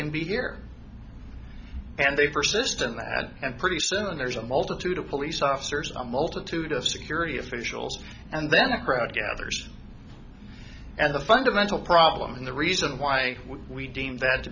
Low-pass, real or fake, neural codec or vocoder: 7.2 kHz; real; none